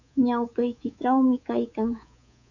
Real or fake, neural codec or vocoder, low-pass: fake; codec, 24 kHz, 3.1 kbps, DualCodec; 7.2 kHz